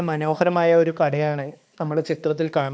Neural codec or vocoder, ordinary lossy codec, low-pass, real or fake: codec, 16 kHz, 2 kbps, X-Codec, HuBERT features, trained on balanced general audio; none; none; fake